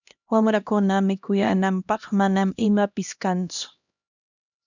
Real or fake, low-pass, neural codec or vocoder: fake; 7.2 kHz; codec, 16 kHz, 1 kbps, X-Codec, HuBERT features, trained on LibriSpeech